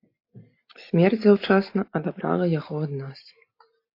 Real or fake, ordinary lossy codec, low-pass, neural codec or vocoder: real; AAC, 32 kbps; 5.4 kHz; none